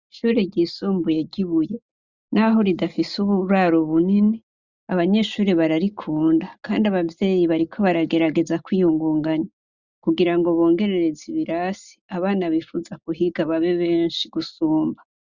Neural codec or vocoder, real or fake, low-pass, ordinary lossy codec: none; real; 7.2 kHz; Opus, 64 kbps